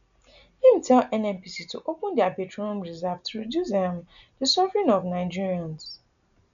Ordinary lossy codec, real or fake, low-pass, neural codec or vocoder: none; real; 7.2 kHz; none